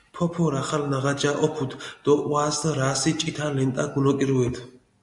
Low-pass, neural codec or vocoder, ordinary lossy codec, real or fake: 10.8 kHz; none; MP3, 64 kbps; real